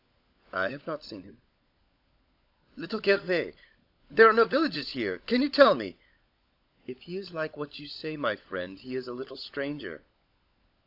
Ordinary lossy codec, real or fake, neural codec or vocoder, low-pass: AAC, 48 kbps; fake; codec, 16 kHz, 16 kbps, FunCodec, trained on LibriTTS, 50 frames a second; 5.4 kHz